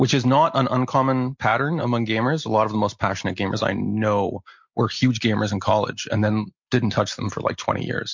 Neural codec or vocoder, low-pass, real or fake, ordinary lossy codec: none; 7.2 kHz; real; MP3, 48 kbps